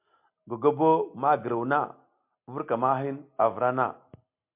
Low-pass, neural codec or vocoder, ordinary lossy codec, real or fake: 3.6 kHz; none; MP3, 32 kbps; real